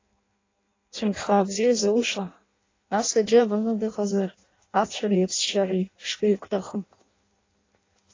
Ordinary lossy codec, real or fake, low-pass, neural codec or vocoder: AAC, 32 kbps; fake; 7.2 kHz; codec, 16 kHz in and 24 kHz out, 0.6 kbps, FireRedTTS-2 codec